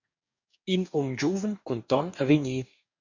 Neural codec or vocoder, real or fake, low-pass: codec, 44.1 kHz, 2.6 kbps, DAC; fake; 7.2 kHz